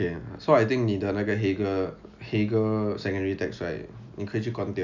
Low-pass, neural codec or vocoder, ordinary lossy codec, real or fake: 7.2 kHz; none; none; real